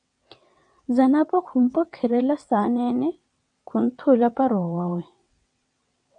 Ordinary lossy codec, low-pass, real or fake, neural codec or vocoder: MP3, 96 kbps; 9.9 kHz; fake; vocoder, 22.05 kHz, 80 mel bands, WaveNeXt